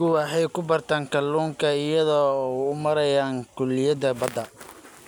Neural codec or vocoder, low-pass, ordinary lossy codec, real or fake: none; none; none; real